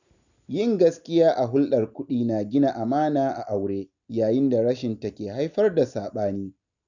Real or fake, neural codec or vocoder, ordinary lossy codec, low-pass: real; none; none; 7.2 kHz